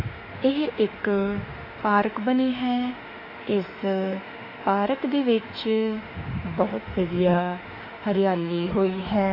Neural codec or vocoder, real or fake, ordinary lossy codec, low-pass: autoencoder, 48 kHz, 32 numbers a frame, DAC-VAE, trained on Japanese speech; fake; AAC, 24 kbps; 5.4 kHz